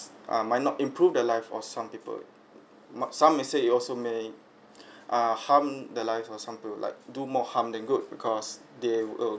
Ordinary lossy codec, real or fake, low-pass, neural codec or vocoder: none; real; none; none